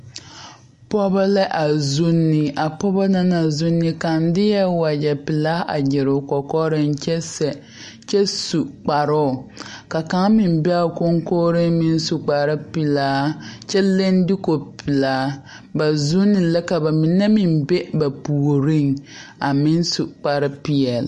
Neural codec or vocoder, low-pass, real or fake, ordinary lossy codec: none; 14.4 kHz; real; MP3, 48 kbps